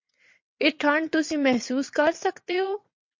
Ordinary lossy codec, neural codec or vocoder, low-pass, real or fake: MP3, 48 kbps; vocoder, 22.05 kHz, 80 mel bands, WaveNeXt; 7.2 kHz; fake